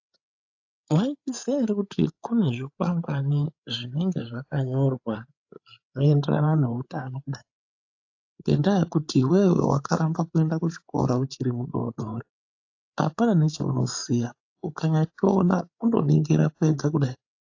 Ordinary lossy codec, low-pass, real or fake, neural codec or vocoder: AAC, 48 kbps; 7.2 kHz; fake; codec, 16 kHz, 8 kbps, FreqCodec, larger model